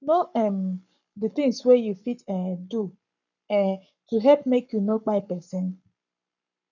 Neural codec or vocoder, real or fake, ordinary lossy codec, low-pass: codec, 44.1 kHz, 7.8 kbps, Pupu-Codec; fake; none; 7.2 kHz